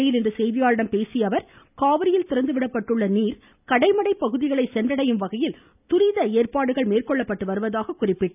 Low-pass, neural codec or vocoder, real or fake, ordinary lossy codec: 3.6 kHz; none; real; none